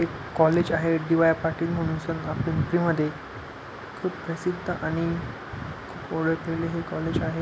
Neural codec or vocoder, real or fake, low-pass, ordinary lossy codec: none; real; none; none